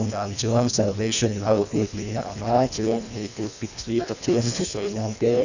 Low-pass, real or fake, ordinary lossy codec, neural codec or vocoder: 7.2 kHz; fake; none; codec, 24 kHz, 1.5 kbps, HILCodec